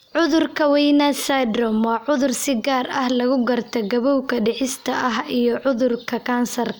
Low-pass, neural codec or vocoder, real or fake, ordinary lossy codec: none; none; real; none